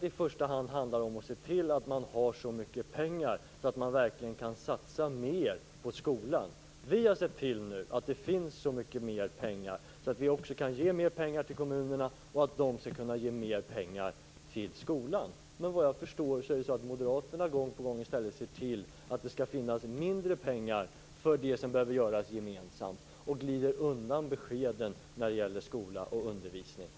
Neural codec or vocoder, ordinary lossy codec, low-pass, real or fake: none; none; none; real